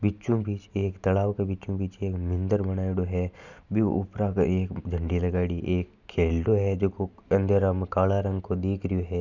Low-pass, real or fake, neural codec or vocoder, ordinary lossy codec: 7.2 kHz; real; none; none